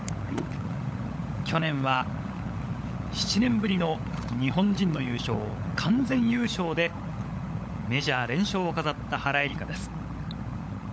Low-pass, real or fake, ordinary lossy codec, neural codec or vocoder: none; fake; none; codec, 16 kHz, 16 kbps, FunCodec, trained on LibriTTS, 50 frames a second